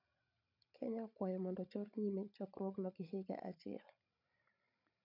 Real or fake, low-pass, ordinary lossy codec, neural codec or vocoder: fake; 5.4 kHz; none; codec, 16 kHz, 16 kbps, FreqCodec, larger model